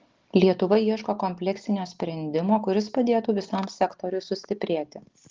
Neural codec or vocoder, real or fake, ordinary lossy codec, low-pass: none; real; Opus, 16 kbps; 7.2 kHz